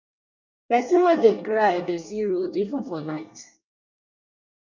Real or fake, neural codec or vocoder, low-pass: fake; codec, 24 kHz, 1 kbps, SNAC; 7.2 kHz